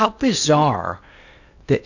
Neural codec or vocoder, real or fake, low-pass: codec, 16 kHz in and 24 kHz out, 0.8 kbps, FocalCodec, streaming, 65536 codes; fake; 7.2 kHz